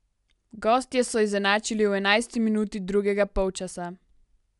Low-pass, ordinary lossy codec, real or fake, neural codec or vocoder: 10.8 kHz; none; real; none